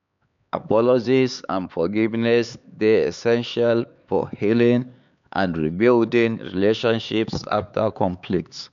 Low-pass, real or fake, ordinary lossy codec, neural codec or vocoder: 7.2 kHz; fake; none; codec, 16 kHz, 4 kbps, X-Codec, HuBERT features, trained on LibriSpeech